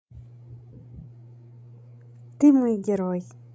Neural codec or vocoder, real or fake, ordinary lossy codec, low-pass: codec, 16 kHz, 16 kbps, FreqCodec, larger model; fake; none; none